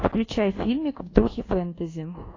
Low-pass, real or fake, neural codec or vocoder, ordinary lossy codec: 7.2 kHz; fake; codec, 24 kHz, 1.2 kbps, DualCodec; AAC, 32 kbps